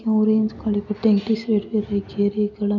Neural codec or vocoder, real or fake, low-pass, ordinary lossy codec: none; real; 7.2 kHz; none